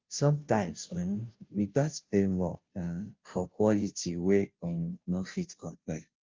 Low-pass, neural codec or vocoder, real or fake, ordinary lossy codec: 7.2 kHz; codec, 16 kHz, 0.5 kbps, FunCodec, trained on Chinese and English, 25 frames a second; fake; Opus, 32 kbps